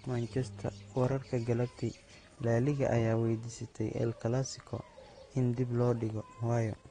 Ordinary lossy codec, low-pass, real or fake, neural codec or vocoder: AAC, 32 kbps; 9.9 kHz; real; none